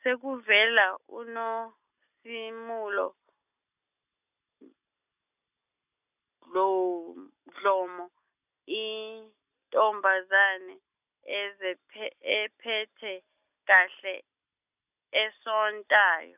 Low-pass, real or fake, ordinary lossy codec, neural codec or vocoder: 3.6 kHz; real; none; none